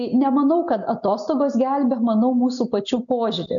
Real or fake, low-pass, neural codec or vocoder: real; 7.2 kHz; none